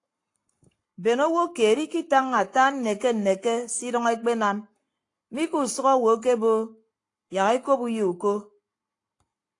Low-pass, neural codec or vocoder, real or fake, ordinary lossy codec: 10.8 kHz; codec, 44.1 kHz, 7.8 kbps, Pupu-Codec; fake; AAC, 48 kbps